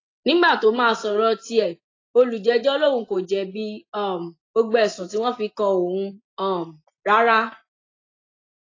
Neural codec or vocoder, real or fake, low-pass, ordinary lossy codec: none; real; 7.2 kHz; AAC, 32 kbps